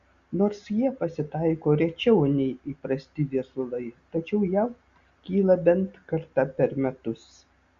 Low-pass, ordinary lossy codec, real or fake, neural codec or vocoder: 7.2 kHz; Opus, 64 kbps; real; none